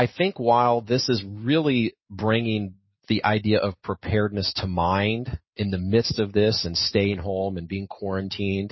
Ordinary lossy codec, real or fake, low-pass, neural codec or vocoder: MP3, 24 kbps; real; 7.2 kHz; none